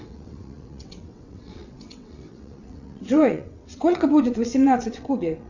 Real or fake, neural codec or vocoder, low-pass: fake; vocoder, 22.05 kHz, 80 mel bands, WaveNeXt; 7.2 kHz